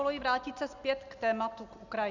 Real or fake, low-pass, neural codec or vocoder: real; 7.2 kHz; none